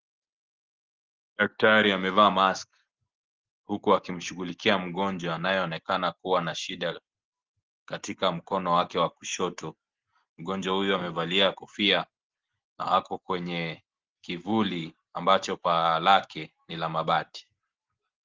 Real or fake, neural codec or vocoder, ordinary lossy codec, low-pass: real; none; Opus, 16 kbps; 7.2 kHz